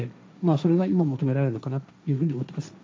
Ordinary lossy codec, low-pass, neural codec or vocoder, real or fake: none; none; codec, 16 kHz, 1.1 kbps, Voila-Tokenizer; fake